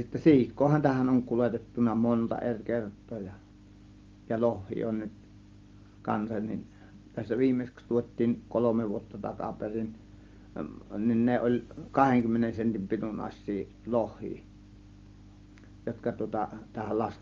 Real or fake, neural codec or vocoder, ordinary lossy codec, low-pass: real; none; Opus, 32 kbps; 7.2 kHz